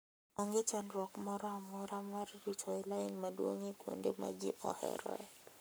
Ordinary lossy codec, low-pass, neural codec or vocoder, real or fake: none; none; codec, 44.1 kHz, 7.8 kbps, Pupu-Codec; fake